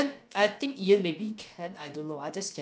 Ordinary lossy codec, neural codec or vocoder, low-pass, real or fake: none; codec, 16 kHz, about 1 kbps, DyCAST, with the encoder's durations; none; fake